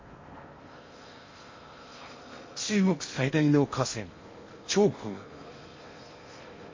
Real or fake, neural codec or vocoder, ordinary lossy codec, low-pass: fake; codec, 16 kHz in and 24 kHz out, 0.6 kbps, FocalCodec, streaming, 4096 codes; MP3, 32 kbps; 7.2 kHz